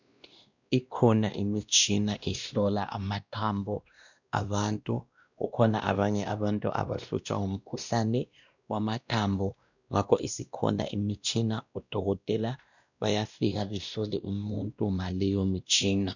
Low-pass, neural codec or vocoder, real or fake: 7.2 kHz; codec, 16 kHz, 1 kbps, X-Codec, WavLM features, trained on Multilingual LibriSpeech; fake